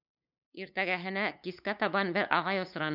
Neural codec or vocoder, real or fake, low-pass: codec, 16 kHz, 8 kbps, FunCodec, trained on LibriTTS, 25 frames a second; fake; 5.4 kHz